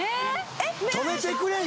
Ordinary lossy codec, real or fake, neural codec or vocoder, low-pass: none; real; none; none